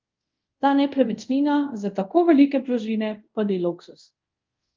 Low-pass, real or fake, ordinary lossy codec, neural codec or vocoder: 7.2 kHz; fake; Opus, 24 kbps; codec, 24 kHz, 0.5 kbps, DualCodec